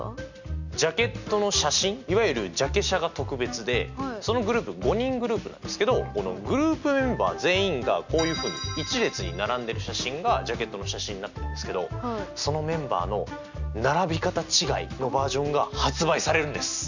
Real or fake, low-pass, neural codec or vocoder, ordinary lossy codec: real; 7.2 kHz; none; none